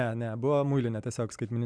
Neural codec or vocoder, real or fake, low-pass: none; real; 9.9 kHz